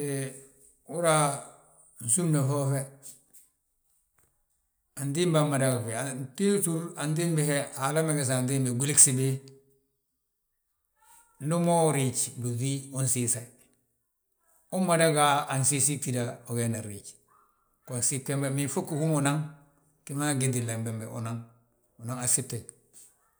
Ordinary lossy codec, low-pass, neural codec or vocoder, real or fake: none; none; none; real